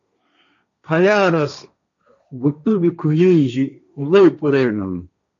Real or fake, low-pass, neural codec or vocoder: fake; 7.2 kHz; codec, 16 kHz, 1.1 kbps, Voila-Tokenizer